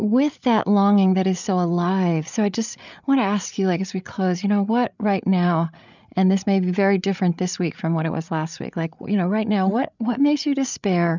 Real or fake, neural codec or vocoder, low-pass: fake; codec, 16 kHz, 8 kbps, FreqCodec, larger model; 7.2 kHz